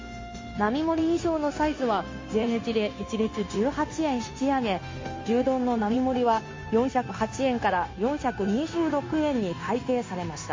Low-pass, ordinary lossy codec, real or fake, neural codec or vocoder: 7.2 kHz; MP3, 32 kbps; fake; codec, 16 kHz, 0.9 kbps, LongCat-Audio-Codec